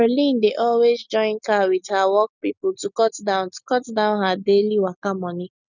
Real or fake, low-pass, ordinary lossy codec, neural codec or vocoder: real; 7.2 kHz; none; none